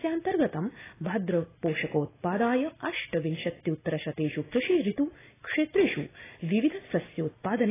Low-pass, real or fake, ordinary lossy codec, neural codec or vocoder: 3.6 kHz; real; AAC, 16 kbps; none